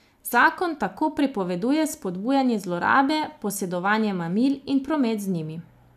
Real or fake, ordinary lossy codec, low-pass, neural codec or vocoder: real; none; 14.4 kHz; none